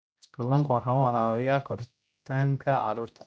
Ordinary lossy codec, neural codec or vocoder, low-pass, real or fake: none; codec, 16 kHz, 0.5 kbps, X-Codec, HuBERT features, trained on general audio; none; fake